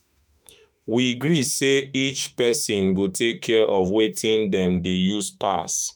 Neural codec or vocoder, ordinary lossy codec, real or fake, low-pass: autoencoder, 48 kHz, 32 numbers a frame, DAC-VAE, trained on Japanese speech; none; fake; none